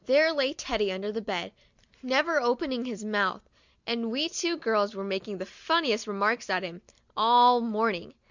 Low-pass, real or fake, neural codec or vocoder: 7.2 kHz; real; none